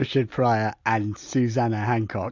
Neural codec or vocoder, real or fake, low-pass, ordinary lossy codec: none; real; 7.2 kHz; AAC, 48 kbps